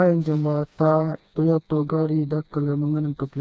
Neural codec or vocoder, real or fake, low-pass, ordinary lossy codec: codec, 16 kHz, 2 kbps, FreqCodec, smaller model; fake; none; none